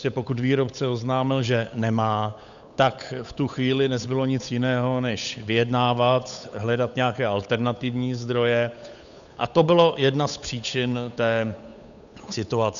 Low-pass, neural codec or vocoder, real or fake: 7.2 kHz; codec, 16 kHz, 8 kbps, FunCodec, trained on Chinese and English, 25 frames a second; fake